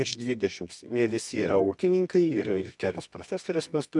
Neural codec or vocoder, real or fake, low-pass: codec, 24 kHz, 0.9 kbps, WavTokenizer, medium music audio release; fake; 10.8 kHz